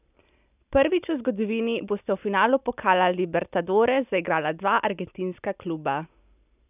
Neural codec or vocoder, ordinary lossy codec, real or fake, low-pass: none; none; real; 3.6 kHz